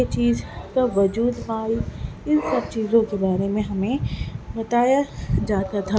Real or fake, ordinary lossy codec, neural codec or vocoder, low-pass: real; none; none; none